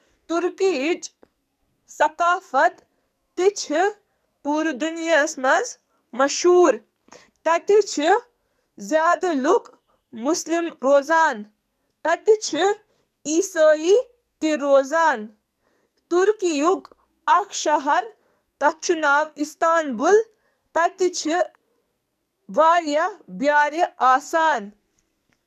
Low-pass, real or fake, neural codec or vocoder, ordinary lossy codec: 14.4 kHz; fake; codec, 44.1 kHz, 2.6 kbps, SNAC; none